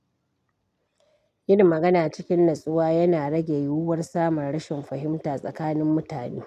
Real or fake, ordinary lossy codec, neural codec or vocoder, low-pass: real; none; none; 14.4 kHz